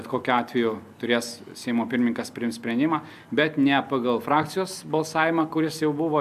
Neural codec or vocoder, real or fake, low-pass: none; real; 14.4 kHz